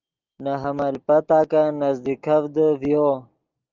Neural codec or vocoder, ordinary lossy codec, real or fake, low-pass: none; Opus, 24 kbps; real; 7.2 kHz